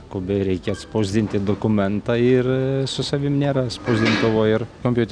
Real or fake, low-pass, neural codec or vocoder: real; 9.9 kHz; none